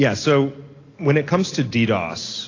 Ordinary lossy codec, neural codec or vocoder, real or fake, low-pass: AAC, 32 kbps; none; real; 7.2 kHz